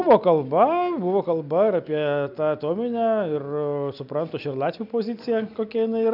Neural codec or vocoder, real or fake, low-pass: none; real; 5.4 kHz